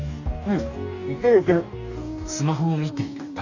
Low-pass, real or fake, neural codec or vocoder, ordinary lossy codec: 7.2 kHz; fake; codec, 44.1 kHz, 2.6 kbps, DAC; none